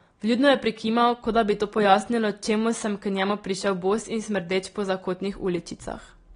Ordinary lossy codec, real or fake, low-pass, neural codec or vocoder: AAC, 32 kbps; real; 9.9 kHz; none